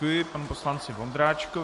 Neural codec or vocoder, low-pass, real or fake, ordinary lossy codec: none; 14.4 kHz; real; MP3, 48 kbps